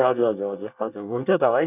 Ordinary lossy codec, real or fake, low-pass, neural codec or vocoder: none; fake; 3.6 kHz; codec, 24 kHz, 1 kbps, SNAC